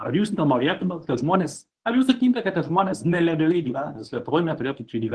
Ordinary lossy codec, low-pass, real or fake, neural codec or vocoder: Opus, 16 kbps; 10.8 kHz; fake; codec, 24 kHz, 0.9 kbps, WavTokenizer, medium speech release version 1